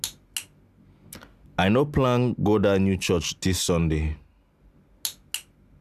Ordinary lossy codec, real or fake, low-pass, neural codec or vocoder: none; real; 14.4 kHz; none